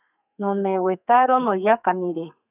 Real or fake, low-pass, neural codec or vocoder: fake; 3.6 kHz; codec, 32 kHz, 1.9 kbps, SNAC